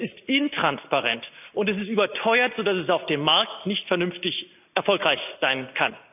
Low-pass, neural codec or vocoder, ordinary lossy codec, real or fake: 3.6 kHz; none; none; real